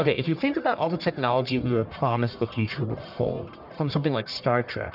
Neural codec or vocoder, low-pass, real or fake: codec, 44.1 kHz, 1.7 kbps, Pupu-Codec; 5.4 kHz; fake